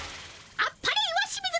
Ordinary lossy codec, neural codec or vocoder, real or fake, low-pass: none; none; real; none